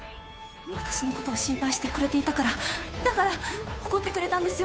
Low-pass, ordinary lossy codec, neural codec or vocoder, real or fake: none; none; codec, 16 kHz, 2 kbps, FunCodec, trained on Chinese and English, 25 frames a second; fake